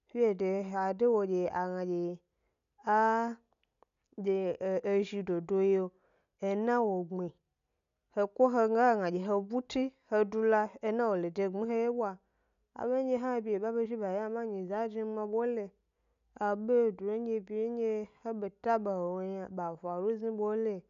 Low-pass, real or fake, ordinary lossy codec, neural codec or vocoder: 7.2 kHz; real; none; none